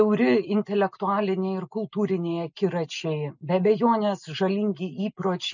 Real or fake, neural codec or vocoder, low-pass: real; none; 7.2 kHz